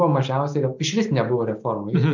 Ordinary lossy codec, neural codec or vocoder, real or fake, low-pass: MP3, 48 kbps; none; real; 7.2 kHz